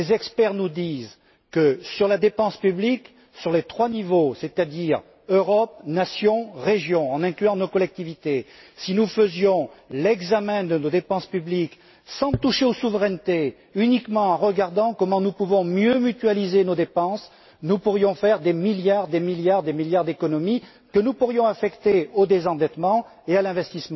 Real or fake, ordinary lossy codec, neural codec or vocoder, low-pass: real; MP3, 24 kbps; none; 7.2 kHz